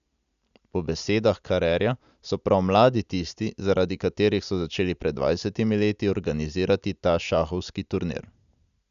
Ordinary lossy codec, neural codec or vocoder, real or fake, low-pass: none; none; real; 7.2 kHz